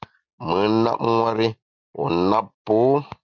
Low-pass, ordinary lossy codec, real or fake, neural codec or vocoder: 7.2 kHz; MP3, 64 kbps; real; none